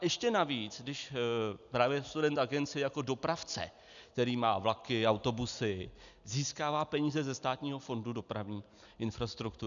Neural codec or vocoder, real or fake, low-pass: none; real; 7.2 kHz